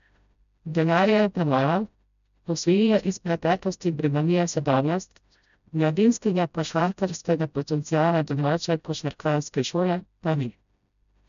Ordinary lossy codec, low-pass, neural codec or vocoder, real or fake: none; 7.2 kHz; codec, 16 kHz, 0.5 kbps, FreqCodec, smaller model; fake